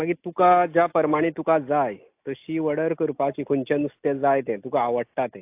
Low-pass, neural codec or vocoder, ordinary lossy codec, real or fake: 3.6 kHz; none; AAC, 32 kbps; real